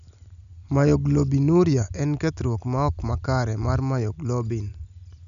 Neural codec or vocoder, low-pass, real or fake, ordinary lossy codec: none; 7.2 kHz; real; MP3, 96 kbps